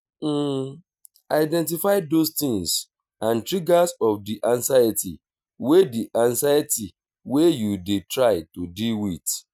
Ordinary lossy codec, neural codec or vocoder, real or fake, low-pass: none; none; real; none